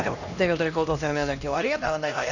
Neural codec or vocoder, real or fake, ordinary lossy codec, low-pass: codec, 16 kHz, 1 kbps, X-Codec, HuBERT features, trained on LibriSpeech; fake; none; 7.2 kHz